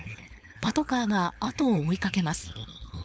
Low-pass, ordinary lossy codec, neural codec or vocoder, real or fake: none; none; codec, 16 kHz, 4.8 kbps, FACodec; fake